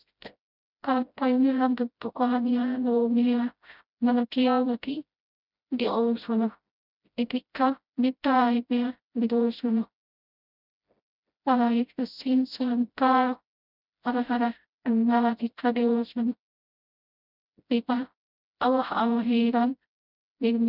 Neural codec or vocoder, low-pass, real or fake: codec, 16 kHz, 0.5 kbps, FreqCodec, smaller model; 5.4 kHz; fake